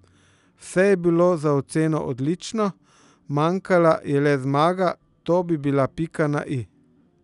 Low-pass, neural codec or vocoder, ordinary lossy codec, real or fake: 10.8 kHz; none; none; real